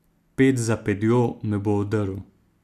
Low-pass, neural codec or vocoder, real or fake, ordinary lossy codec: 14.4 kHz; none; real; none